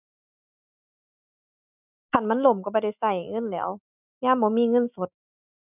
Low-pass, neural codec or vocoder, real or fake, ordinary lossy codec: 3.6 kHz; none; real; none